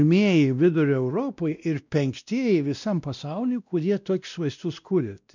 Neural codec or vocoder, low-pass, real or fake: codec, 16 kHz, 1 kbps, X-Codec, WavLM features, trained on Multilingual LibriSpeech; 7.2 kHz; fake